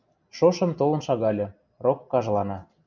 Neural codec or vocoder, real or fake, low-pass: none; real; 7.2 kHz